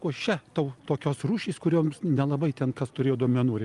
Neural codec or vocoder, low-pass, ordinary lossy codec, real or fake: none; 10.8 kHz; Opus, 32 kbps; real